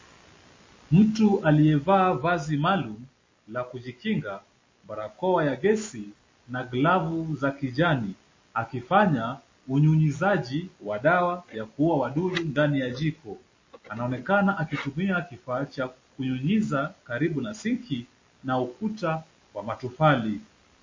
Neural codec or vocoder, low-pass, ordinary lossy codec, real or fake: none; 7.2 kHz; MP3, 32 kbps; real